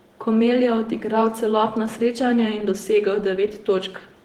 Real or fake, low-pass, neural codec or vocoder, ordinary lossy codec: fake; 19.8 kHz; vocoder, 48 kHz, 128 mel bands, Vocos; Opus, 16 kbps